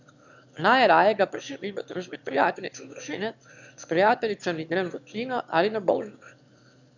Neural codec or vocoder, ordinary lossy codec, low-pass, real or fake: autoencoder, 22.05 kHz, a latent of 192 numbers a frame, VITS, trained on one speaker; none; 7.2 kHz; fake